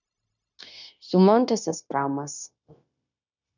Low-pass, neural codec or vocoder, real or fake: 7.2 kHz; codec, 16 kHz, 0.9 kbps, LongCat-Audio-Codec; fake